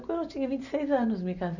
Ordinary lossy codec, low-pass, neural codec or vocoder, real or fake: none; 7.2 kHz; none; real